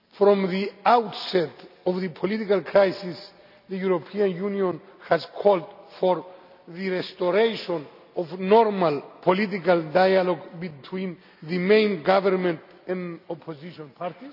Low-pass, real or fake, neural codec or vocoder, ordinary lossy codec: 5.4 kHz; real; none; none